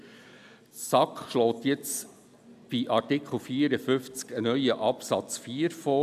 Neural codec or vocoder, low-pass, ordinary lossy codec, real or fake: none; 14.4 kHz; none; real